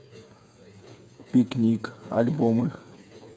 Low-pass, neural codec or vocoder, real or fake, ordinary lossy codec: none; codec, 16 kHz, 8 kbps, FreqCodec, smaller model; fake; none